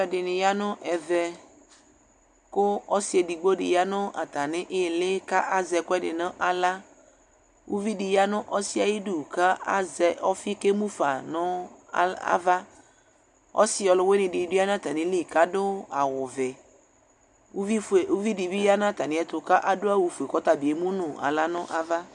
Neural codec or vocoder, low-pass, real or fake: none; 10.8 kHz; real